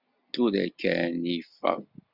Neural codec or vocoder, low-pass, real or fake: none; 5.4 kHz; real